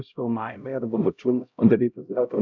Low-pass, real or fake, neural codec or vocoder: 7.2 kHz; fake; codec, 16 kHz, 0.5 kbps, X-Codec, HuBERT features, trained on LibriSpeech